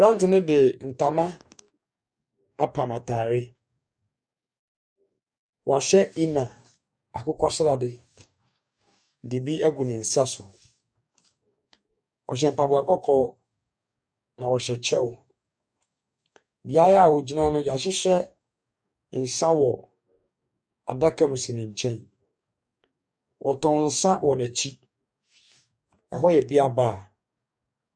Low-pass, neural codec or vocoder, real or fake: 9.9 kHz; codec, 44.1 kHz, 2.6 kbps, DAC; fake